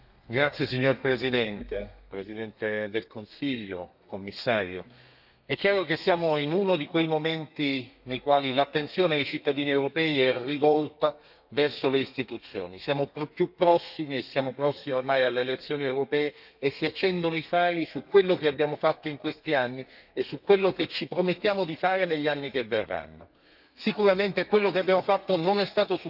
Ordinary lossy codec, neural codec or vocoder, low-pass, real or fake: none; codec, 32 kHz, 1.9 kbps, SNAC; 5.4 kHz; fake